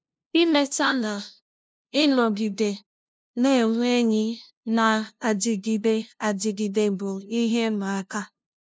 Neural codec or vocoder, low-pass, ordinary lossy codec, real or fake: codec, 16 kHz, 0.5 kbps, FunCodec, trained on LibriTTS, 25 frames a second; none; none; fake